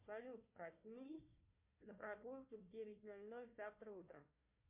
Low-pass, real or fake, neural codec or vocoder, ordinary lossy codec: 3.6 kHz; fake; codec, 16 kHz, 1 kbps, FunCodec, trained on Chinese and English, 50 frames a second; MP3, 32 kbps